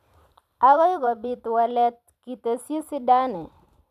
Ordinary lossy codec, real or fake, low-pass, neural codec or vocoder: none; fake; 14.4 kHz; vocoder, 44.1 kHz, 128 mel bands every 512 samples, BigVGAN v2